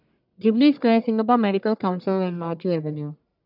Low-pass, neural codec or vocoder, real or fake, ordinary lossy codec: 5.4 kHz; codec, 44.1 kHz, 1.7 kbps, Pupu-Codec; fake; none